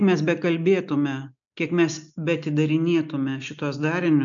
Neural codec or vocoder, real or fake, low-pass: none; real; 7.2 kHz